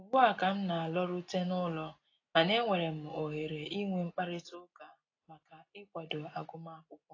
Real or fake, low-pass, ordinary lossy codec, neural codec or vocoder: real; 7.2 kHz; AAC, 32 kbps; none